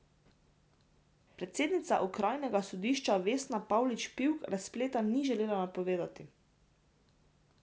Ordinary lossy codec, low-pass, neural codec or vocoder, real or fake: none; none; none; real